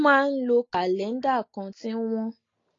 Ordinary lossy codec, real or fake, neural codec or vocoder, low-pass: AAC, 32 kbps; real; none; 7.2 kHz